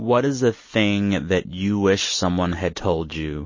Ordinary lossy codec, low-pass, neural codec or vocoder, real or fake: MP3, 32 kbps; 7.2 kHz; none; real